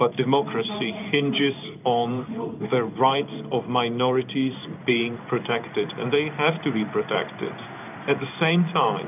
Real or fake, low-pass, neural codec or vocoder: fake; 3.6 kHz; codec, 16 kHz in and 24 kHz out, 1 kbps, XY-Tokenizer